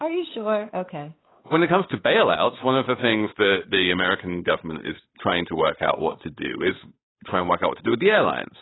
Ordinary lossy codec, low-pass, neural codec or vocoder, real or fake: AAC, 16 kbps; 7.2 kHz; codec, 16 kHz, 8 kbps, FunCodec, trained on Chinese and English, 25 frames a second; fake